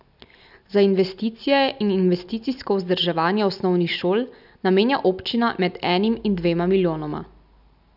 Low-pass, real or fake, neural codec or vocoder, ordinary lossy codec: 5.4 kHz; real; none; none